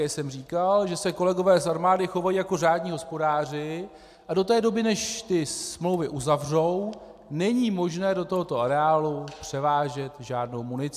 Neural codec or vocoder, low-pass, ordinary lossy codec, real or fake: none; 14.4 kHz; AAC, 96 kbps; real